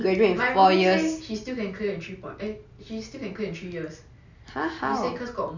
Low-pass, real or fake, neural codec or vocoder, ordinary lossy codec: 7.2 kHz; real; none; none